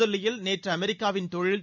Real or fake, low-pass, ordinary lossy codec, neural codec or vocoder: real; 7.2 kHz; none; none